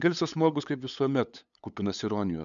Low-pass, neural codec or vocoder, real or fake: 7.2 kHz; codec, 16 kHz, 8 kbps, FunCodec, trained on LibriTTS, 25 frames a second; fake